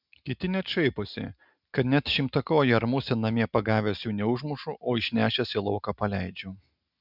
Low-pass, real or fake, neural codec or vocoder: 5.4 kHz; real; none